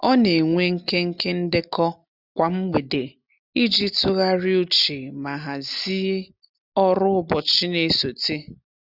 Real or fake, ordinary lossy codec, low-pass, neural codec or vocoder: real; none; 5.4 kHz; none